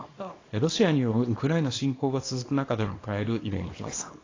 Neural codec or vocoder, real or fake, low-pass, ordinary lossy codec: codec, 24 kHz, 0.9 kbps, WavTokenizer, small release; fake; 7.2 kHz; AAC, 32 kbps